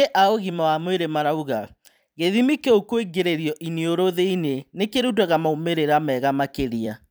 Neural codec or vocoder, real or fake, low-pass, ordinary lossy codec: vocoder, 44.1 kHz, 128 mel bands every 256 samples, BigVGAN v2; fake; none; none